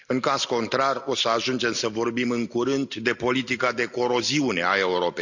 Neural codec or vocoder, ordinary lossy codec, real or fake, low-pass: none; none; real; 7.2 kHz